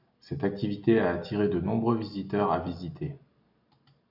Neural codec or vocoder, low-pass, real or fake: none; 5.4 kHz; real